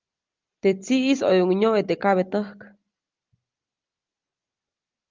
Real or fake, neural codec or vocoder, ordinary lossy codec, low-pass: real; none; Opus, 24 kbps; 7.2 kHz